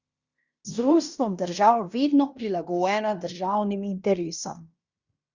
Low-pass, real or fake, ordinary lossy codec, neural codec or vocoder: 7.2 kHz; fake; Opus, 64 kbps; codec, 16 kHz in and 24 kHz out, 0.9 kbps, LongCat-Audio-Codec, fine tuned four codebook decoder